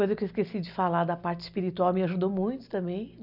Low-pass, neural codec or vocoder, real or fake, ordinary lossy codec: 5.4 kHz; none; real; none